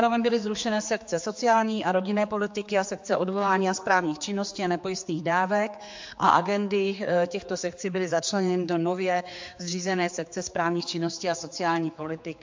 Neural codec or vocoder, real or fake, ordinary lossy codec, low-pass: codec, 16 kHz, 4 kbps, X-Codec, HuBERT features, trained on general audio; fake; MP3, 48 kbps; 7.2 kHz